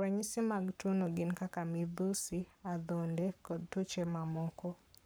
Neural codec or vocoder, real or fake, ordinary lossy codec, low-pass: codec, 44.1 kHz, 7.8 kbps, Pupu-Codec; fake; none; none